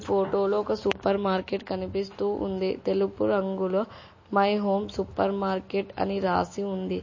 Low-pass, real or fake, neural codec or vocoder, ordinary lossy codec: 7.2 kHz; real; none; MP3, 32 kbps